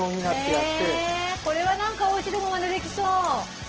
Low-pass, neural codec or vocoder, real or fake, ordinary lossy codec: 7.2 kHz; none; real; Opus, 16 kbps